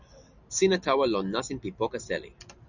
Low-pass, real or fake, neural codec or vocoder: 7.2 kHz; real; none